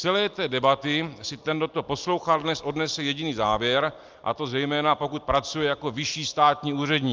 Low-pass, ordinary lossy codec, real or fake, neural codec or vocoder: 7.2 kHz; Opus, 24 kbps; real; none